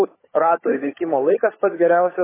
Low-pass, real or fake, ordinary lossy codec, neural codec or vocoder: 3.6 kHz; fake; MP3, 16 kbps; codec, 16 kHz, 16 kbps, FunCodec, trained on LibriTTS, 50 frames a second